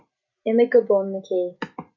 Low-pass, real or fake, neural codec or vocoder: 7.2 kHz; real; none